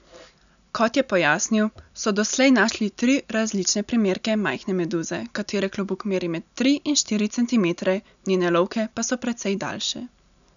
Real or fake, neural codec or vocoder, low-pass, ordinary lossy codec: real; none; 7.2 kHz; none